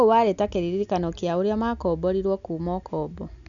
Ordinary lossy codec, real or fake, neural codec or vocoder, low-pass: none; real; none; 7.2 kHz